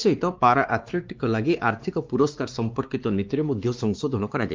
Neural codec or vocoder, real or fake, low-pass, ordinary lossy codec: codec, 16 kHz, 2 kbps, X-Codec, WavLM features, trained on Multilingual LibriSpeech; fake; 7.2 kHz; Opus, 32 kbps